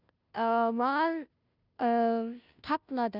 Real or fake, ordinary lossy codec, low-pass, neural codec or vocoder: fake; none; 5.4 kHz; codec, 16 kHz, 0.5 kbps, FunCodec, trained on Chinese and English, 25 frames a second